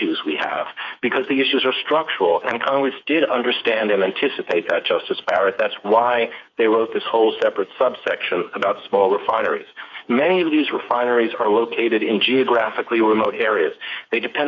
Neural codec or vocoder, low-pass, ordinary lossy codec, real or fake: codec, 16 kHz, 4 kbps, FreqCodec, smaller model; 7.2 kHz; MP3, 64 kbps; fake